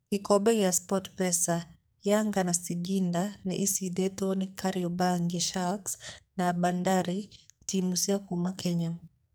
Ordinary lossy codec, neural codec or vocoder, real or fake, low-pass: none; codec, 44.1 kHz, 2.6 kbps, SNAC; fake; none